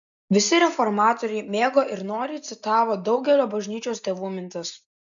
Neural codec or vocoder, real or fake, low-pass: none; real; 7.2 kHz